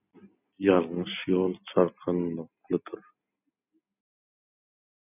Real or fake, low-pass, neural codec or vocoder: real; 3.6 kHz; none